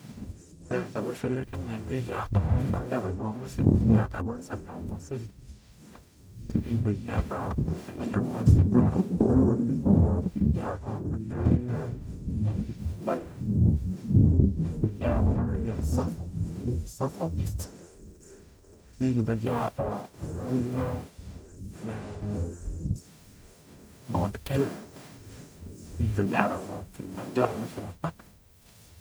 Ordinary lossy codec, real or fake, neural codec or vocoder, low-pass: none; fake; codec, 44.1 kHz, 0.9 kbps, DAC; none